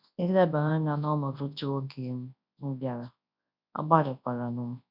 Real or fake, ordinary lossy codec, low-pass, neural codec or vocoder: fake; none; 5.4 kHz; codec, 24 kHz, 0.9 kbps, WavTokenizer, large speech release